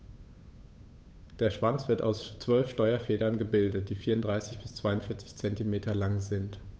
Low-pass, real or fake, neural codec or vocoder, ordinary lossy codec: none; fake; codec, 16 kHz, 8 kbps, FunCodec, trained on Chinese and English, 25 frames a second; none